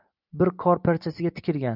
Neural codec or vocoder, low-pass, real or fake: none; 5.4 kHz; real